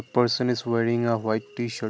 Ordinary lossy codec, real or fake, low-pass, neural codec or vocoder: none; real; none; none